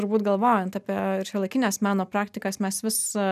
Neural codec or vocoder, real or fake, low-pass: none; real; 14.4 kHz